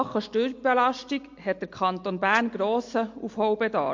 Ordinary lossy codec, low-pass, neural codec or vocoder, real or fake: MP3, 48 kbps; 7.2 kHz; none; real